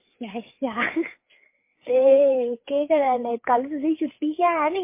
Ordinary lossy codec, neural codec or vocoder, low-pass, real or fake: MP3, 24 kbps; codec, 16 kHz, 4 kbps, FreqCodec, larger model; 3.6 kHz; fake